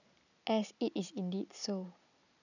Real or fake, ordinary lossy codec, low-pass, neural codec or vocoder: real; none; 7.2 kHz; none